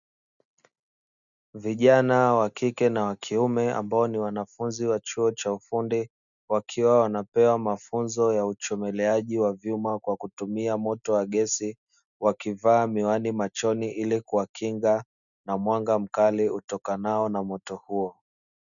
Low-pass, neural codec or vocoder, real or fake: 7.2 kHz; none; real